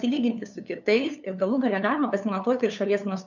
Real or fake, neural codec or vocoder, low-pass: fake; codec, 16 kHz, 2 kbps, FunCodec, trained on LibriTTS, 25 frames a second; 7.2 kHz